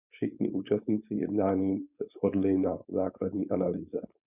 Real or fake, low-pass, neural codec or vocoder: fake; 3.6 kHz; codec, 16 kHz, 4.8 kbps, FACodec